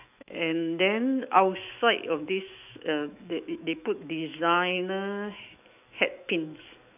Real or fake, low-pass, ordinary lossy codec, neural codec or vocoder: fake; 3.6 kHz; none; autoencoder, 48 kHz, 128 numbers a frame, DAC-VAE, trained on Japanese speech